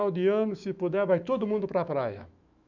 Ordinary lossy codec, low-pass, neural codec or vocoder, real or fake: none; 7.2 kHz; autoencoder, 48 kHz, 128 numbers a frame, DAC-VAE, trained on Japanese speech; fake